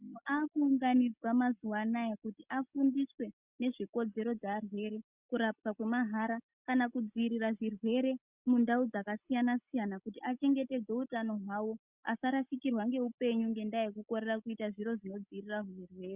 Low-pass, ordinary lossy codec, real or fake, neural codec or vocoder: 3.6 kHz; Opus, 64 kbps; real; none